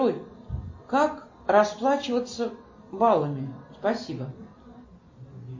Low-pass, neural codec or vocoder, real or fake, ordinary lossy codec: 7.2 kHz; none; real; MP3, 32 kbps